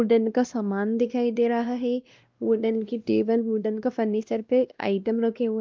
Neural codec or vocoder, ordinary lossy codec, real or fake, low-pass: codec, 16 kHz, 1 kbps, X-Codec, WavLM features, trained on Multilingual LibriSpeech; Opus, 24 kbps; fake; 7.2 kHz